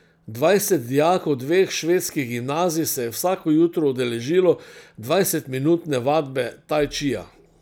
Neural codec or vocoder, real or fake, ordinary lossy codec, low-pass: none; real; none; none